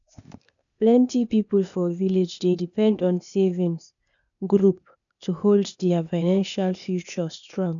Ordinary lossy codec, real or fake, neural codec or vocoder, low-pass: none; fake; codec, 16 kHz, 0.8 kbps, ZipCodec; 7.2 kHz